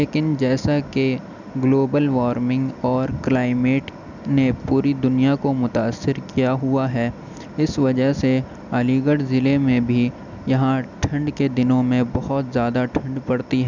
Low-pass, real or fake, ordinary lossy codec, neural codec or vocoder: 7.2 kHz; real; none; none